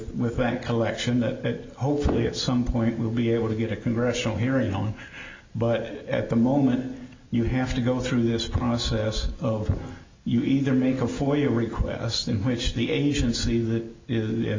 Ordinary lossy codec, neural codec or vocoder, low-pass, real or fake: MP3, 64 kbps; none; 7.2 kHz; real